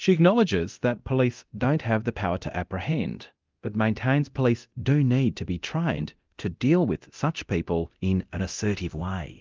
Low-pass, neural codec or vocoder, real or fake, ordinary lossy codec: 7.2 kHz; codec, 24 kHz, 0.9 kbps, DualCodec; fake; Opus, 32 kbps